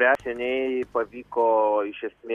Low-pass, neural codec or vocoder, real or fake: 14.4 kHz; none; real